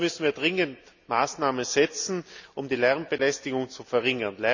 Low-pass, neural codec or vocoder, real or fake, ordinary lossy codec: 7.2 kHz; none; real; none